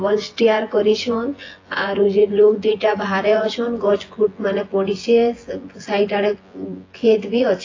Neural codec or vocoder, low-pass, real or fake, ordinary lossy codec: vocoder, 24 kHz, 100 mel bands, Vocos; 7.2 kHz; fake; AAC, 32 kbps